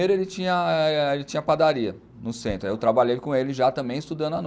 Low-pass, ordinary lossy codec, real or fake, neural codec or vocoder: none; none; real; none